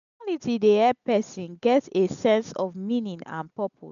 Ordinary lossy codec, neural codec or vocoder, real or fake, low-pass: none; none; real; 7.2 kHz